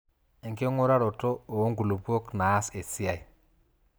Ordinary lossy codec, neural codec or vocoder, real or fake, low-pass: none; none; real; none